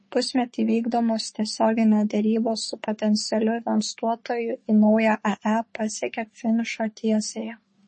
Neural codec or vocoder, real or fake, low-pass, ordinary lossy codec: codec, 44.1 kHz, 3.4 kbps, Pupu-Codec; fake; 10.8 kHz; MP3, 32 kbps